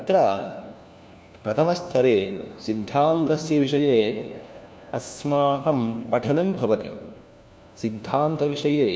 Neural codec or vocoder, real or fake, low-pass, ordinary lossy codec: codec, 16 kHz, 1 kbps, FunCodec, trained on LibriTTS, 50 frames a second; fake; none; none